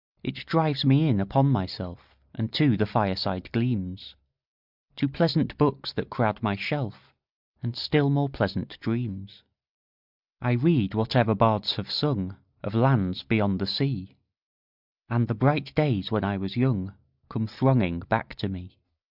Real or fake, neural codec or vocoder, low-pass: real; none; 5.4 kHz